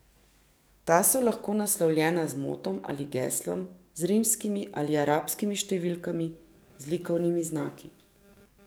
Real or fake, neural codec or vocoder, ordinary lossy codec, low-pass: fake; codec, 44.1 kHz, 7.8 kbps, DAC; none; none